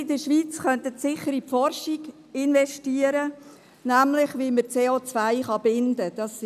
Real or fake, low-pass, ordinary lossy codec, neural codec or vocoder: real; 14.4 kHz; none; none